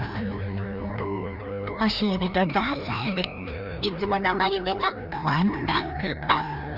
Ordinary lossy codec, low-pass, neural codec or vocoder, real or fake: Opus, 64 kbps; 5.4 kHz; codec, 16 kHz, 1 kbps, FreqCodec, larger model; fake